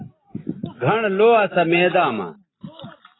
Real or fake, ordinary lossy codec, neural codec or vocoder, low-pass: real; AAC, 16 kbps; none; 7.2 kHz